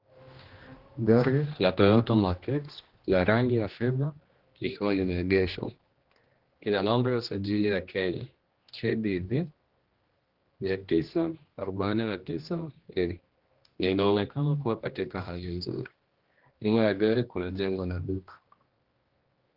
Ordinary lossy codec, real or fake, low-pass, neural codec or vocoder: Opus, 16 kbps; fake; 5.4 kHz; codec, 16 kHz, 1 kbps, X-Codec, HuBERT features, trained on general audio